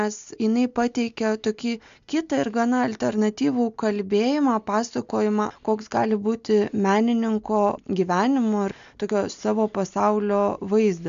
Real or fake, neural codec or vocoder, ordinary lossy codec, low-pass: real; none; MP3, 64 kbps; 7.2 kHz